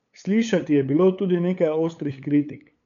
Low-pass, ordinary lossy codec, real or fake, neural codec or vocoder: 7.2 kHz; none; fake; codec, 16 kHz, 8 kbps, FunCodec, trained on LibriTTS, 25 frames a second